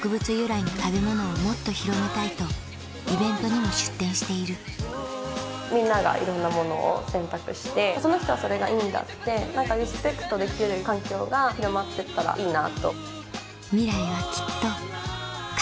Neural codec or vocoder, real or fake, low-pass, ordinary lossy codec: none; real; none; none